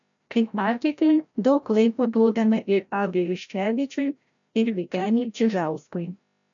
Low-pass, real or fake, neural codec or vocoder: 7.2 kHz; fake; codec, 16 kHz, 0.5 kbps, FreqCodec, larger model